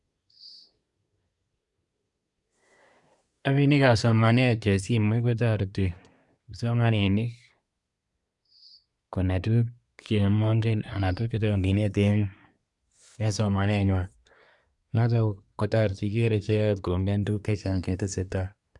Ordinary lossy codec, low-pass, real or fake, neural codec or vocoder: none; 10.8 kHz; fake; codec, 24 kHz, 1 kbps, SNAC